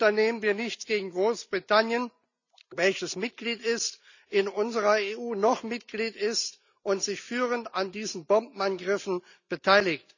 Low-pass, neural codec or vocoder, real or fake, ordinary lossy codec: 7.2 kHz; none; real; none